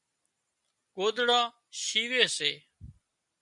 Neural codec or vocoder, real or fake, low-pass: none; real; 10.8 kHz